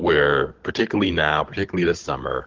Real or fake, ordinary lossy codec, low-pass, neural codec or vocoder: fake; Opus, 16 kbps; 7.2 kHz; codec, 16 kHz, 16 kbps, FunCodec, trained on Chinese and English, 50 frames a second